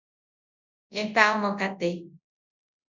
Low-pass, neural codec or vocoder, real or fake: 7.2 kHz; codec, 24 kHz, 0.9 kbps, WavTokenizer, large speech release; fake